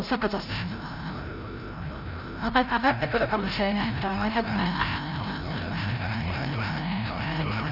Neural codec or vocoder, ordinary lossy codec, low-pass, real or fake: codec, 16 kHz, 0.5 kbps, FreqCodec, larger model; none; 5.4 kHz; fake